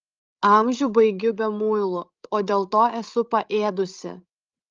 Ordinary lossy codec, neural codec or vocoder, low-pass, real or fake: Opus, 32 kbps; codec, 16 kHz, 16 kbps, FreqCodec, larger model; 7.2 kHz; fake